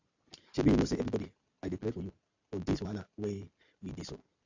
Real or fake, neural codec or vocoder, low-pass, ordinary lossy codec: real; none; 7.2 kHz; AAC, 48 kbps